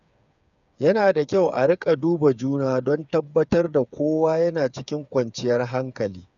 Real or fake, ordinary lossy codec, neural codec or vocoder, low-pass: fake; none; codec, 16 kHz, 8 kbps, FreqCodec, smaller model; 7.2 kHz